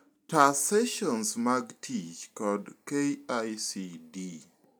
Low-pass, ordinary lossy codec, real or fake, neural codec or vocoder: none; none; real; none